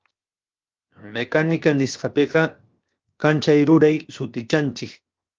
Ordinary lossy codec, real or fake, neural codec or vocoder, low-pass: Opus, 24 kbps; fake; codec, 16 kHz, 0.7 kbps, FocalCodec; 7.2 kHz